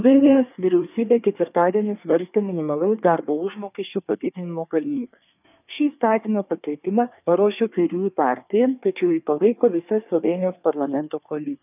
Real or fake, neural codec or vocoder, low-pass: fake; codec, 24 kHz, 1 kbps, SNAC; 3.6 kHz